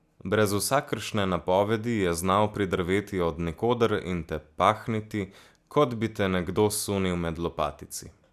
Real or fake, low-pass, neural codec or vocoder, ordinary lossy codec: real; 14.4 kHz; none; none